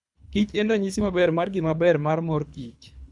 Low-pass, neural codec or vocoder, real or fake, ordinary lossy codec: 10.8 kHz; codec, 24 kHz, 3 kbps, HILCodec; fake; none